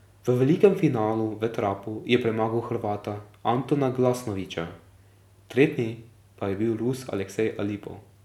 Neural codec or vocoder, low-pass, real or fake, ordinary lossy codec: none; 19.8 kHz; real; none